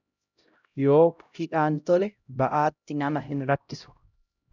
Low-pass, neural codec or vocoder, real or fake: 7.2 kHz; codec, 16 kHz, 0.5 kbps, X-Codec, HuBERT features, trained on LibriSpeech; fake